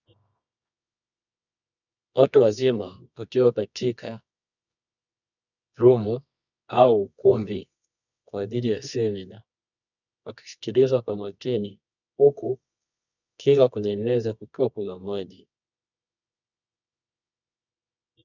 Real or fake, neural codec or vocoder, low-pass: fake; codec, 24 kHz, 0.9 kbps, WavTokenizer, medium music audio release; 7.2 kHz